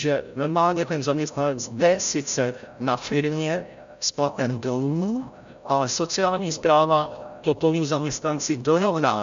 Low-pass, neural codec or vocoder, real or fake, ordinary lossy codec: 7.2 kHz; codec, 16 kHz, 0.5 kbps, FreqCodec, larger model; fake; MP3, 64 kbps